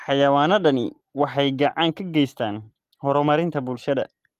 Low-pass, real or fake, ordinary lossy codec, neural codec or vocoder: 14.4 kHz; real; Opus, 24 kbps; none